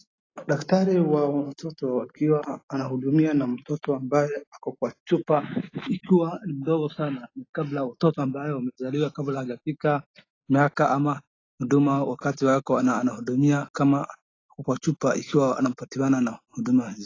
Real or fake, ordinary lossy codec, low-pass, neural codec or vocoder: real; AAC, 32 kbps; 7.2 kHz; none